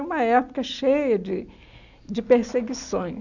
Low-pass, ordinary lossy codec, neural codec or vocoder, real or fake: 7.2 kHz; none; none; real